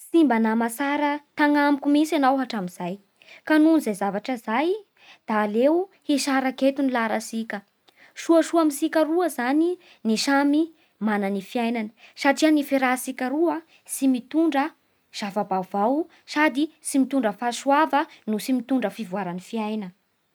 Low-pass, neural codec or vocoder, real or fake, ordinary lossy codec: none; none; real; none